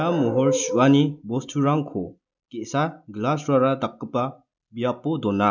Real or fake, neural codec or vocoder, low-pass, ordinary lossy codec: real; none; 7.2 kHz; none